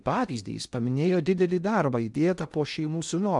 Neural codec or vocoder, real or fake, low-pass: codec, 16 kHz in and 24 kHz out, 0.6 kbps, FocalCodec, streaming, 2048 codes; fake; 10.8 kHz